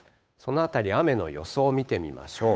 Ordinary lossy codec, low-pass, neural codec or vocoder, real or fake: none; none; codec, 16 kHz, 8 kbps, FunCodec, trained on Chinese and English, 25 frames a second; fake